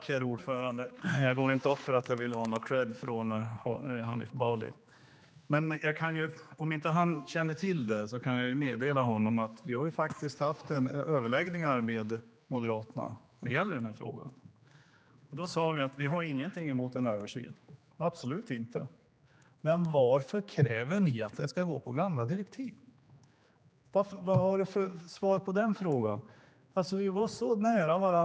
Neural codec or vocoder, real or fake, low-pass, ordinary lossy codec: codec, 16 kHz, 2 kbps, X-Codec, HuBERT features, trained on general audio; fake; none; none